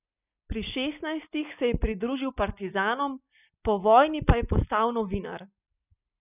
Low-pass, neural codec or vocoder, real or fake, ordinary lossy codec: 3.6 kHz; none; real; none